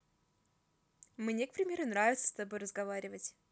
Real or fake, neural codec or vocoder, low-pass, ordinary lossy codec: real; none; none; none